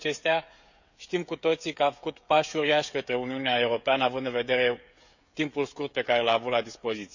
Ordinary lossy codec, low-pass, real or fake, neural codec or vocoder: none; 7.2 kHz; fake; codec, 16 kHz, 16 kbps, FreqCodec, smaller model